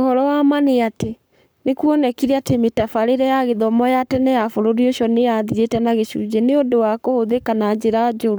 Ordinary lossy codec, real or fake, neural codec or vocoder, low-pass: none; fake; codec, 44.1 kHz, 7.8 kbps, Pupu-Codec; none